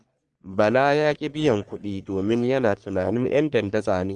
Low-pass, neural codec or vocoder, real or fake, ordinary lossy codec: 10.8 kHz; codec, 44.1 kHz, 3.4 kbps, Pupu-Codec; fake; Opus, 24 kbps